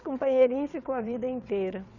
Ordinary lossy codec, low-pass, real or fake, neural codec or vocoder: none; 7.2 kHz; fake; vocoder, 22.05 kHz, 80 mel bands, WaveNeXt